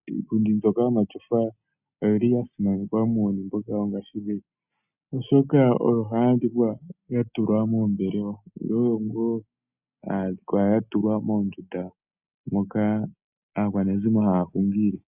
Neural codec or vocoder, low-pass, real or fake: none; 3.6 kHz; real